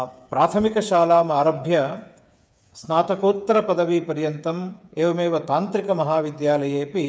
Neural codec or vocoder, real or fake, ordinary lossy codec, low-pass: codec, 16 kHz, 8 kbps, FreqCodec, smaller model; fake; none; none